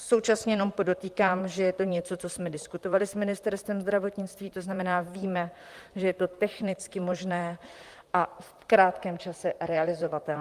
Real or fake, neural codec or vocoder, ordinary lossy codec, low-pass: fake; vocoder, 44.1 kHz, 128 mel bands, Pupu-Vocoder; Opus, 32 kbps; 14.4 kHz